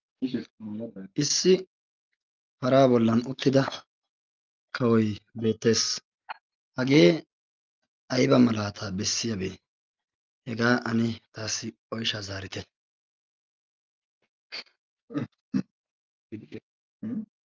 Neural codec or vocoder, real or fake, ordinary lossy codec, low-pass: none; real; Opus, 32 kbps; 7.2 kHz